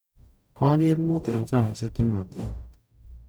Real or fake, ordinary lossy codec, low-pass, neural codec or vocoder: fake; none; none; codec, 44.1 kHz, 0.9 kbps, DAC